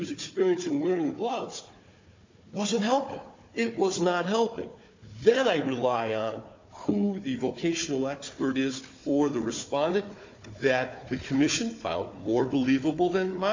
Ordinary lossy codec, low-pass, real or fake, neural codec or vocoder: MP3, 64 kbps; 7.2 kHz; fake; codec, 16 kHz, 4 kbps, FunCodec, trained on Chinese and English, 50 frames a second